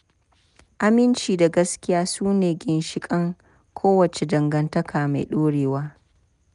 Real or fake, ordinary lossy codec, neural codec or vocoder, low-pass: real; none; none; 10.8 kHz